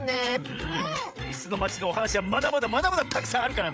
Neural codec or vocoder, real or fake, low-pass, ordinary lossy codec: codec, 16 kHz, 8 kbps, FreqCodec, larger model; fake; none; none